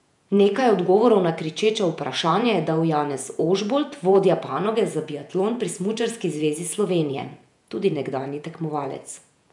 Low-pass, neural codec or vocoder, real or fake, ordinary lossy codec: 10.8 kHz; vocoder, 48 kHz, 128 mel bands, Vocos; fake; none